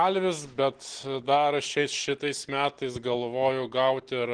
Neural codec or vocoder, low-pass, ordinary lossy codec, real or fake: none; 9.9 kHz; Opus, 16 kbps; real